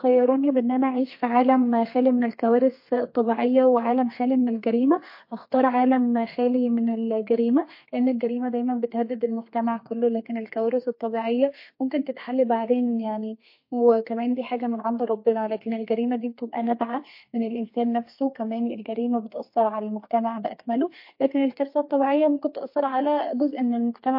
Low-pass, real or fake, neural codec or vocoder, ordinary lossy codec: 5.4 kHz; fake; codec, 32 kHz, 1.9 kbps, SNAC; MP3, 32 kbps